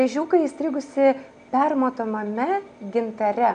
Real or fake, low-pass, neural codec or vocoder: real; 9.9 kHz; none